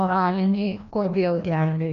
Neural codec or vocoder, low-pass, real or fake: codec, 16 kHz, 1 kbps, FreqCodec, larger model; 7.2 kHz; fake